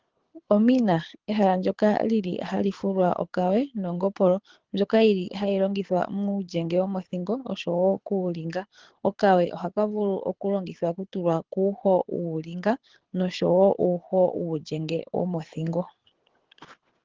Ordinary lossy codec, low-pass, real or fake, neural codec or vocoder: Opus, 16 kbps; 7.2 kHz; fake; codec, 24 kHz, 6 kbps, HILCodec